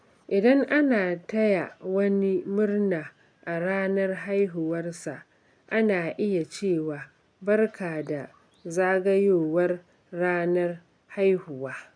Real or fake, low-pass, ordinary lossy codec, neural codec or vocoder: real; 9.9 kHz; none; none